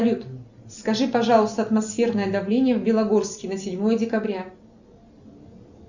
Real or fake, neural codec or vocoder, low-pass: real; none; 7.2 kHz